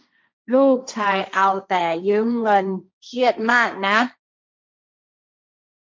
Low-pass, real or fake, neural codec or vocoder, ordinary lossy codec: none; fake; codec, 16 kHz, 1.1 kbps, Voila-Tokenizer; none